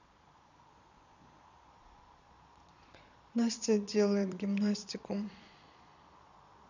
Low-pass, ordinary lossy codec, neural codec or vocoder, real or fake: 7.2 kHz; none; vocoder, 22.05 kHz, 80 mel bands, Vocos; fake